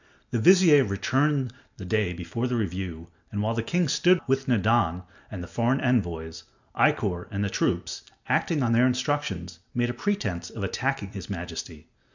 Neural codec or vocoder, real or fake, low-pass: none; real; 7.2 kHz